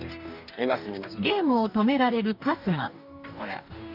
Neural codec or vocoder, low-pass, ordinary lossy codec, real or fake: codec, 44.1 kHz, 2.6 kbps, DAC; 5.4 kHz; none; fake